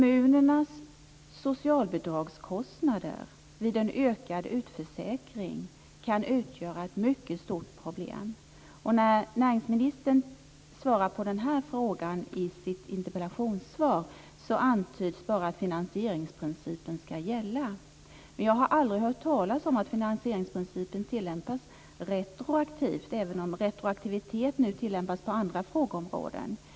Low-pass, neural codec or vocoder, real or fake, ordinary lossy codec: none; none; real; none